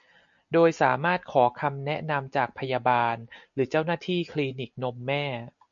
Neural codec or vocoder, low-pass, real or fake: none; 7.2 kHz; real